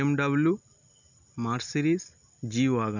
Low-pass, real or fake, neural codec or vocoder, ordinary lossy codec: 7.2 kHz; real; none; none